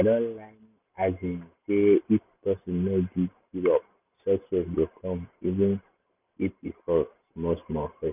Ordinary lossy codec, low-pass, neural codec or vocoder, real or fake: none; 3.6 kHz; none; real